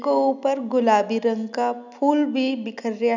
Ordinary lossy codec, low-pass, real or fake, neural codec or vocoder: none; 7.2 kHz; real; none